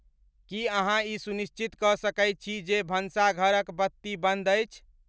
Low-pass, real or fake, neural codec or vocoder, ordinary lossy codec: none; real; none; none